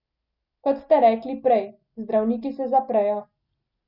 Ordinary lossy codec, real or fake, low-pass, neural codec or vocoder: none; real; 5.4 kHz; none